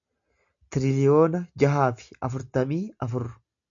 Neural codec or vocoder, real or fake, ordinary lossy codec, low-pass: none; real; AAC, 64 kbps; 7.2 kHz